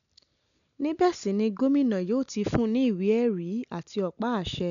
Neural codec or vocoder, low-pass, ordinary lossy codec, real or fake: none; 7.2 kHz; none; real